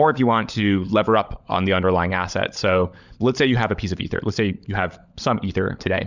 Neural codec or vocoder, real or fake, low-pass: codec, 16 kHz, 16 kbps, FunCodec, trained on LibriTTS, 50 frames a second; fake; 7.2 kHz